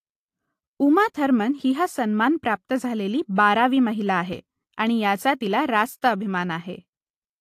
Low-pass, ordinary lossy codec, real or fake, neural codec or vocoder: 14.4 kHz; AAC, 64 kbps; real; none